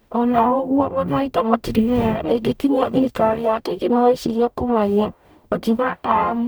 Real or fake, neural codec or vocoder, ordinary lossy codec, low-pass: fake; codec, 44.1 kHz, 0.9 kbps, DAC; none; none